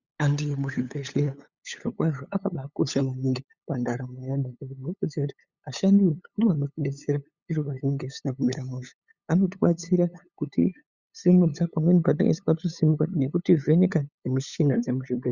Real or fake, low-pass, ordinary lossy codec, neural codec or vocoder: fake; 7.2 kHz; Opus, 64 kbps; codec, 16 kHz, 8 kbps, FunCodec, trained on LibriTTS, 25 frames a second